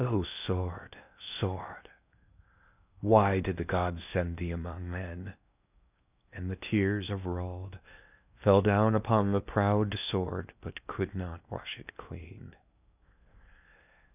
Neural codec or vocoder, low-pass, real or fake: codec, 16 kHz in and 24 kHz out, 0.8 kbps, FocalCodec, streaming, 65536 codes; 3.6 kHz; fake